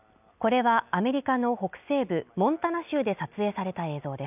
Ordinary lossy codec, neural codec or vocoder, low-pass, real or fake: none; none; 3.6 kHz; real